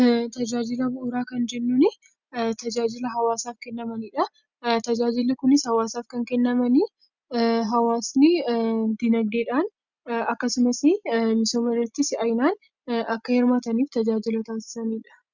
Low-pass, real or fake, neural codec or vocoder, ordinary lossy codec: 7.2 kHz; real; none; Opus, 64 kbps